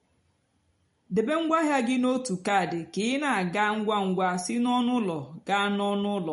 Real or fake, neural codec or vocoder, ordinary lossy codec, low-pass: real; none; MP3, 48 kbps; 10.8 kHz